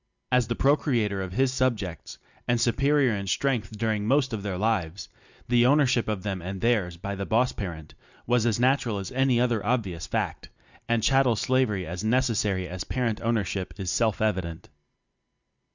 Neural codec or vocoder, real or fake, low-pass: none; real; 7.2 kHz